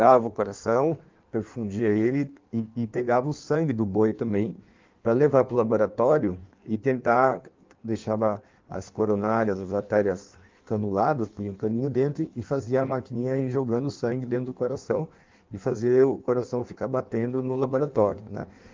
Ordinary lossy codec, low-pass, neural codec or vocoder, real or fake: Opus, 24 kbps; 7.2 kHz; codec, 16 kHz in and 24 kHz out, 1.1 kbps, FireRedTTS-2 codec; fake